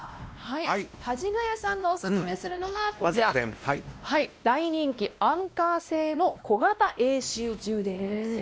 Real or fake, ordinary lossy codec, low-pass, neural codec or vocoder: fake; none; none; codec, 16 kHz, 1 kbps, X-Codec, WavLM features, trained on Multilingual LibriSpeech